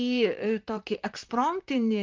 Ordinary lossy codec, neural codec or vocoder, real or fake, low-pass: Opus, 32 kbps; vocoder, 44.1 kHz, 128 mel bands, Pupu-Vocoder; fake; 7.2 kHz